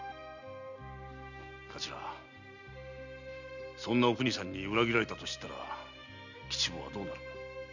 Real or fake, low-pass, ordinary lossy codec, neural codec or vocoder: real; 7.2 kHz; none; none